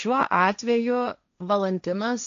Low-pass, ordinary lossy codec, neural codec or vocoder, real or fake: 7.2 kHz; AAC, 64 kbps; codec, 16 kHz, 1.1 kbps, Voila-Tokenizer; fake